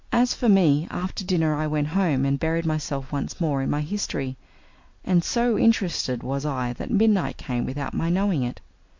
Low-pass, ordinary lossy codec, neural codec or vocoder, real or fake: 7.2 kHz; MP3, 48 kbps; none; real